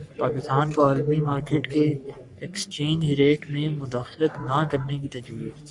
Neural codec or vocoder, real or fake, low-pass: codec, 44.1 kHz, 3.4 kbps, Pupu-Codec; fake; 10.8 kHz